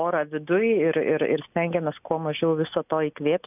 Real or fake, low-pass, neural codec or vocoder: real; 3.6 kHz; none